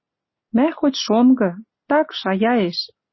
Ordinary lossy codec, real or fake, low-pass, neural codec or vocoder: MP3, 24 kbps; real; 7.2 kHz; none